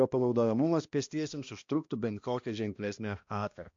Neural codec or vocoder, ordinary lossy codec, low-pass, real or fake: codec, 16 kHz, 1 kbps, X-Codec, HuBERT features, trained on balanced general audio; MP3, 48 kbps; 7.2 kHz; fake